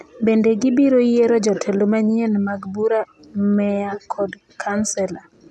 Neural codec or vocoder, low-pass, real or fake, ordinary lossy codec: none; none; real; none